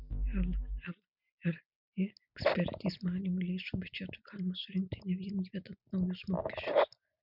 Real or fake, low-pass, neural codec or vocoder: real; 5.4 kHz; none